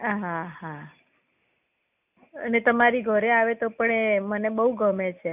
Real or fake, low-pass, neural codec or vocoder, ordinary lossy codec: real; 3.6 kHz; none; none